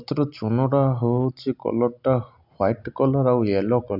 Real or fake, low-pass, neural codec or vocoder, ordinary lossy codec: real; 5.4 kHz; none; none